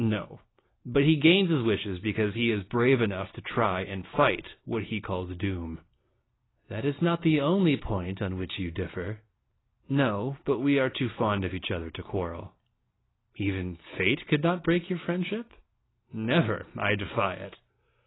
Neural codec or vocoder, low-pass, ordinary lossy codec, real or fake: none; 7.2 kHz; AAC, 16 kbps; real